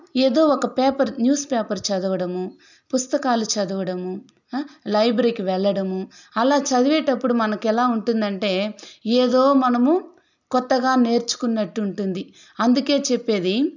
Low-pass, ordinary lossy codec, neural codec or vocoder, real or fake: 7.2 kHz; none; none; real